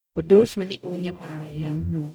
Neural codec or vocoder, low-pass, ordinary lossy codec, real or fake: codec, 44.1 kHz, 0.9 kbps, DAC; none; none; fake